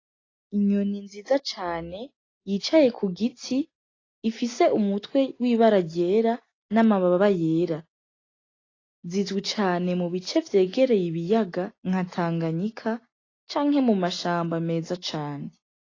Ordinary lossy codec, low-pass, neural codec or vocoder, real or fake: AAC, 32 kbps; 7.2 kHz; none; real